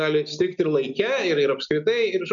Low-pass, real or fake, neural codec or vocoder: 7.2 kHz; real; none